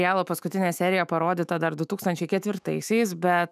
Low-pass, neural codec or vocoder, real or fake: 14.4 kHz; autoencoder, 48 kHz, 128 numbers a frame, DAC-VAE, trained on Japanese speech; fake